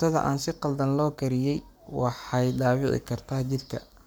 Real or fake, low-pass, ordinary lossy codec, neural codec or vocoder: fake; none; none; codec, 44.1 kHz, 7.8 kbps, Pupu-Codec